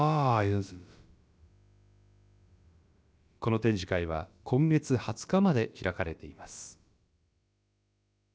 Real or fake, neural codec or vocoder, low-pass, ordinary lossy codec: fake; codec, 16 kHz, about 1 kbps, DyCAST, with the encoder's durations; none; none